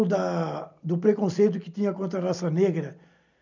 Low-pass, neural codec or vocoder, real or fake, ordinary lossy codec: 7.2 kHz; none; real; none